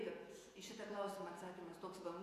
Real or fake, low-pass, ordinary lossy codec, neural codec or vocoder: fake; 14.4 kHz; AAC, 64 kbps; vocoder, 48 kHz, 128 mel bands, Vocos